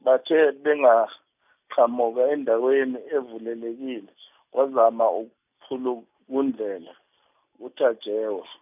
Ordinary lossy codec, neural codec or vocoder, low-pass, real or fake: none; none; 3.6 kHz; real